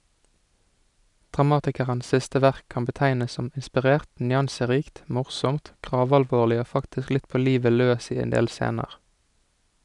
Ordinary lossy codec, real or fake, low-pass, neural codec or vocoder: none; real; 10.8 kHz; none